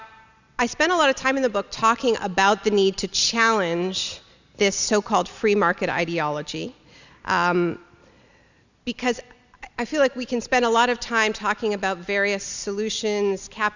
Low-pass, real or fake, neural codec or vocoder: 7.2 kHz; real; none